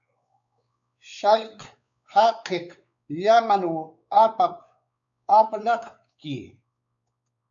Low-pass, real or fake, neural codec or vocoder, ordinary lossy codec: 7.2 kHz; fake; codec, 16 kHz, 4 kbps, X-Codec, WavLM features, trained on Multilingual LibriSpeech; AAC, 64 kbps